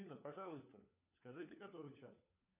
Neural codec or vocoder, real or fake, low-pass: codec, 16 kHz, 4 kbps, FunCodec, trained on LibriTTS, 50 frames a second; fake; 3.6 kHz